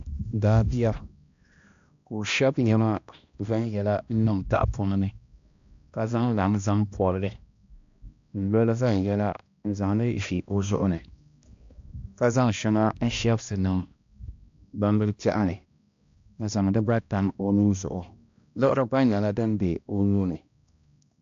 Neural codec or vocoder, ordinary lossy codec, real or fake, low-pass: codec, 16 kHz, 1 kbps, X-Codec, HuBERT features, trained on balanced general audio; AAC, 64 kbps; fake; 7.2 kHz